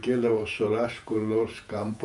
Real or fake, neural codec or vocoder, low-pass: fake; vocoder, 48 kHz, 128 mel bands, Vocos; 10.8 kHz